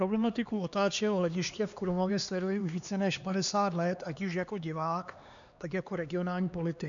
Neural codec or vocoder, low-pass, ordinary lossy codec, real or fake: codec, 16 kHz, 2 kbps, X-Codec, HuBERT features, trained on LibriSpeech; 7.2 kHz; MP3, 96 kbps; fake